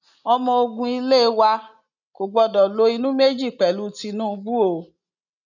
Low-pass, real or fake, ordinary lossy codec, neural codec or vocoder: 7.2 kHz; real; none; none